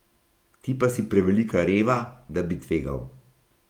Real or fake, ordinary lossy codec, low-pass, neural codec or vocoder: fake; Opus, 32 kbps; 19.8 kHz; vocoder, 48 kHz, 128 mel bands, Vocos